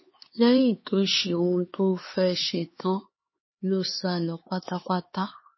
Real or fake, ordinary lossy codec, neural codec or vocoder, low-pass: fake; MP3, 24 kbps; codec, 16 kHz, 4 kbps, X-Codec, HuBERT features, trained on LibriSpeech; 7.2 kHz